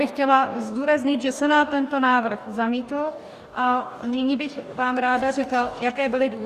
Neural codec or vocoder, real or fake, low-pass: codec, 44.1 kHz, 2.6 kbps, DAC; fake; 14.4 kHz